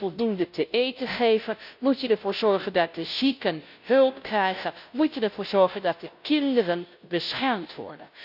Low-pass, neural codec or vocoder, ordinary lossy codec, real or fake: 5.4 kHz; codec, 16 kHz, 0.5 kbps, FunCodec, trained on Chinese and English, 25 frames a second; none; fake